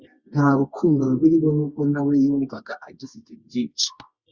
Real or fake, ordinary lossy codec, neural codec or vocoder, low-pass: fake; Opus, 64 kbps; codec, 24 kHz, 0.9 kbps, WavTokenizer, medium music audio release; 7.2 kHz